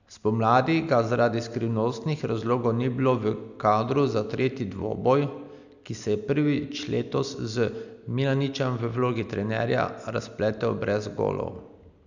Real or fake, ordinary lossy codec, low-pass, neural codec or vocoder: real; none; 7.2 kHz; none